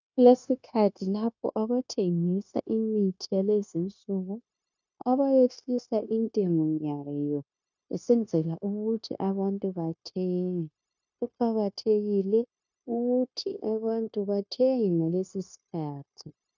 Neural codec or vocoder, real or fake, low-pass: codec, 16 kHz, 0.9 kbps, LongCat-Audio-Codec; fake; 7.2 kHz